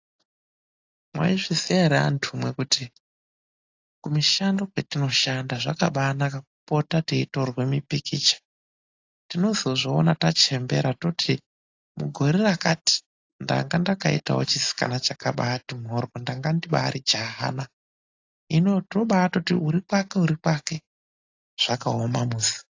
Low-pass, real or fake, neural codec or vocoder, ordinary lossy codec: 7.2 kHz; real; none; AAC, 48 kbps